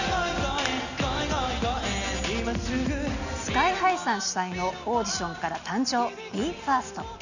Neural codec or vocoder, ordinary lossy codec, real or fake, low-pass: none; none; real; 7.2 kHz